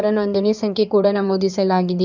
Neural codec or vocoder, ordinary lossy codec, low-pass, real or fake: codec, 16 kHz in and 24 kHz out, 2.2 kbps, FireRedTTS-2 codec; none; 7.2 kHz; fake